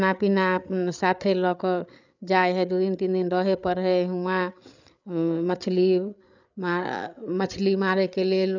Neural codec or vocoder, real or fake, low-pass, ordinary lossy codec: codec, 16 kHz, 8 kbps, FreqCodec, larger model; fake; 7.2 kHz; none